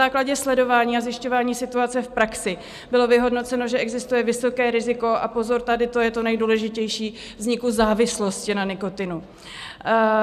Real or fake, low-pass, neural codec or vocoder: real; 14.4 kHz; none